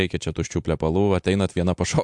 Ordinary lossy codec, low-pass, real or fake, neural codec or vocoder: MP3, 64 kbps; 10.8 kHz; fake; vocoder, 44.1 kHz, 128 mel bands every 256 samples, BigVGAN v2